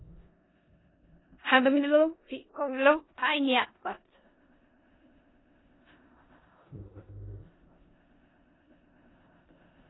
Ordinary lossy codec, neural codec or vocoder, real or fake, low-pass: AAC, 16 kbps; codec, 16 kHz in and 24 kHz out, 0.4 kbps, LongCat-Audio-Codec, four codebook decoder; fake; 7.2 kHz